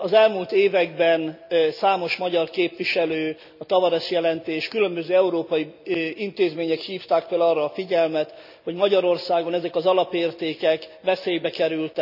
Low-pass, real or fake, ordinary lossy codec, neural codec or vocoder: 5.4 kHz; real; none; none